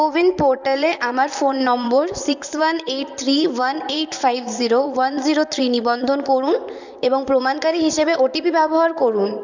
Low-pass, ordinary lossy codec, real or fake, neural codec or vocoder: 7.2 kHz; none; fake; vocoder, 44.1 kHz, 128 mel bands, Pupu-Vocoder